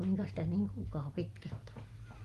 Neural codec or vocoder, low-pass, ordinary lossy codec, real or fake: none; 14.4 kHz; Opus, 24 kbps; real